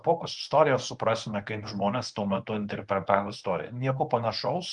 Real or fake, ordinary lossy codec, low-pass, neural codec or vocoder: fake; Opus, 24 kbps; 10.8 kHz; codec, 24 kHz, 0.9 kbps, WavTokenizer, medium speech release version 1